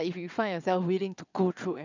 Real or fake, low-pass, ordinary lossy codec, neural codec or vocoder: fake; 7.2 kHz; none; vocoder, 22.05 kHz, 80 mel bands, WaveNeXt